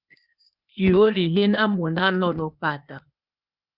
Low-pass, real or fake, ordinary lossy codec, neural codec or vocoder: 5.4 kHz; fake; Opus, 64 kbps; codec, 16 kHz, 0.8 kbps, ZipCodec